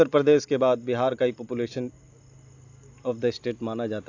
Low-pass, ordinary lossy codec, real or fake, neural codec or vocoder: 7.2 kHz; AAC, 48 kbps; real; none